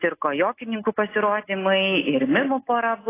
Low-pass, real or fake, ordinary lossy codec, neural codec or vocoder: 3.6 kHz; real; AAC, 16 kbps; none